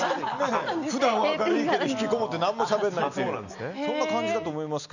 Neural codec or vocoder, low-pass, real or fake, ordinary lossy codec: none; 7.2 kHz; real; none